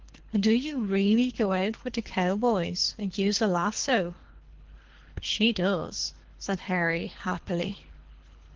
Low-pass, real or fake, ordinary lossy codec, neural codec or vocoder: 7.2 kHz; fake; Opus, 16 kbps; codec, 24 kHz, 3 kbps, HILCodec